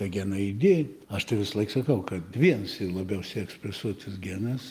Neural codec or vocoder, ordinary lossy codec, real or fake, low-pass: none; Opus, 32 kbps; real; 14.4 kHz